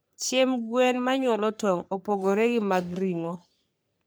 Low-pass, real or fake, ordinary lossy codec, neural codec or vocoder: none; fake; none; codec, 44.1 kHz, 3.4 kbps, Pupu-Codec